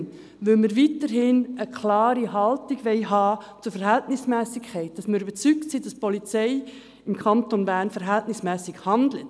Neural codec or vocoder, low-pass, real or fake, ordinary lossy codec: none; none; real; none